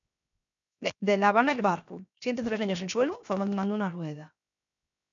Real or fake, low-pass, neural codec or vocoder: fake; 7.2 kHz; codec, 16 kHz, 0.7 kbps, FocalCodec